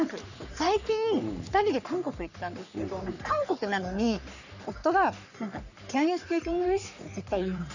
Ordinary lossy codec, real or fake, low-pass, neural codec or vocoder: none; fake; 7.2 kHz; codec, 44.1 kHz, 3.4 kbps, Pupu-Codec